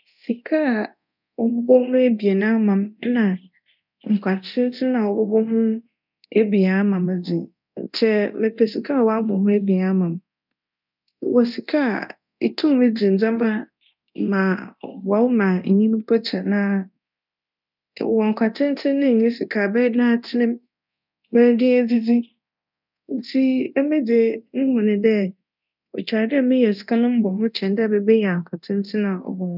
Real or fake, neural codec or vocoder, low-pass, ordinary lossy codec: fake; codec, 24 kHz, 0.9 kbps, DualCodec; 5.4 kHz; none